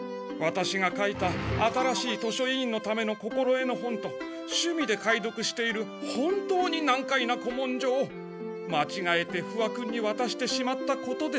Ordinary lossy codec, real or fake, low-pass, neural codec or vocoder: none; real; none; none